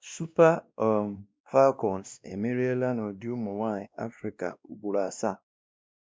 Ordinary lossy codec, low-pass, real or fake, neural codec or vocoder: none; none; fake; codec, 16 kHz, 1 kbps, X-Codec, WavLM features, trained on Multilingual LibriSpeech